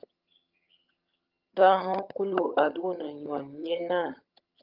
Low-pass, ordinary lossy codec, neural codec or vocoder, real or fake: 5.4 kHz; Opus, 24 kbps; vocoder, 22.05 kHz, 80 mel bands, HiFi-GAN; fake